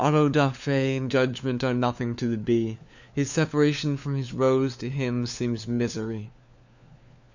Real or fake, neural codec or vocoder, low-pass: fake; codec, 16 kHz, 4 kbps, FunCodec, trained on LibriTTS, 50 frames a second; 7.2 kHz